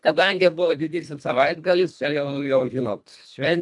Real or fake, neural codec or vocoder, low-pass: fake; codec, 24 kHz, 1.5 kbps, HILCodec; 10.8 kHz